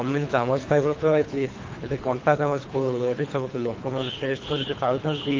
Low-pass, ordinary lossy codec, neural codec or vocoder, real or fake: 7.2 kHz; Opus, 32 kbps; codec, 24 kHz, 3 kbps, HILCodec; fake